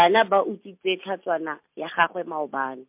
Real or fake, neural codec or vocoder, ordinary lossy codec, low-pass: real; none; MP3, 32 kbps; 3.6 kHz